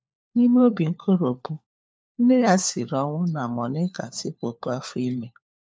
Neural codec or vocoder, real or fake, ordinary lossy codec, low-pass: codec, 16 kHz, 4 kbps, FunCodec, trained on LibriTTS, 50 frames a second; fake; none; none